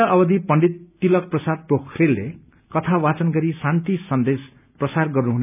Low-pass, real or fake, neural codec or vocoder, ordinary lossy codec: 3.6 kHz; real; none; none